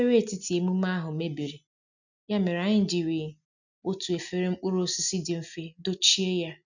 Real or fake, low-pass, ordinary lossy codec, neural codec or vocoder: real; 7.2 kHz; none; none